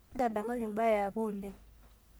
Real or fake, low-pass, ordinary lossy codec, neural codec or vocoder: fake; none; none; codec, 44.1 kHz, 1.7 kbps, Pupu-Codec